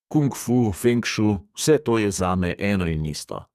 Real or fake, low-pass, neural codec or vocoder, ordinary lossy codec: fake; 14.4 kHz; codec, 32 kHz, 1.9 kbps, SNAC; none